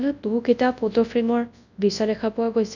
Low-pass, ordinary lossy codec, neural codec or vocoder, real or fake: 7.2 kHz; none; codec, 24 kHz, 0.9 kbps, WavTokenizer, large speech release; fake